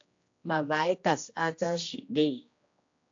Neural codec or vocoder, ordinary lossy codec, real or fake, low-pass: codec, 16 kHz, 1 kbps, X-Codec, HuBERT features, trained on general audio; AAC, 48 kbps; fake; 7.2 kHz